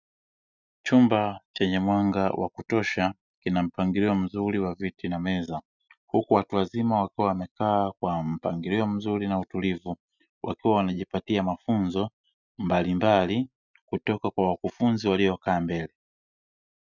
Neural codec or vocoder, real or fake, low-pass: none; real; 7.2 kHz